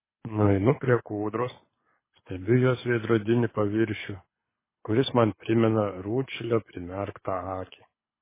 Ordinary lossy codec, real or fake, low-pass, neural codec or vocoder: MP3, 16 kbps; fake; 3.6 kHz; codec, 24 kHz, 6 kbps, HILCodec